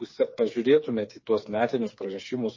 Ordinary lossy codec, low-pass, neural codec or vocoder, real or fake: MP3, 32 kbps; 7.2 kHz; codec, 16 kHz, 4 kbps, FreqCodec, smaller model; fake